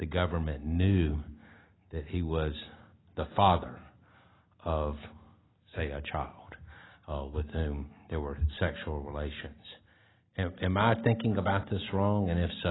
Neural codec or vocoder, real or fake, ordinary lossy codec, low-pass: none; real; AAC, 16 kbps; 7.2 kHz